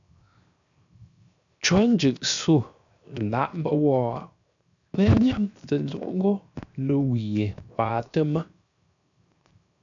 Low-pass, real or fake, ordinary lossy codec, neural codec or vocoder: 7.2 kHz; fake; MP3, 96 kbps; codec, 16 kHz, 0.7 kbps, FocalCodec